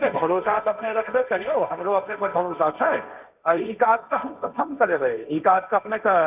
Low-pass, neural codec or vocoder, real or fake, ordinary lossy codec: 3.6 kHz; codec, 16 kHz, 1.1 kbps, Voila-Tokenizer; fake; none